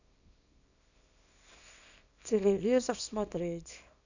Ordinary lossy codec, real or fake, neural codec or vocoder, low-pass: none; fake; codec, 24 kHz, 0.9 kbps, WavTokenizer, small release; 7.2 kHz